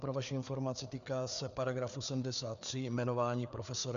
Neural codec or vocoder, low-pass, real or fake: codec, 16 kHz, 4 kbps, FreqCodec, larger model; 7.2 kHz; fake